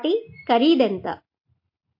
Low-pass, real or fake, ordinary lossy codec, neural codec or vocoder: 5.4 kHz; real; MP3, 32 kbps; none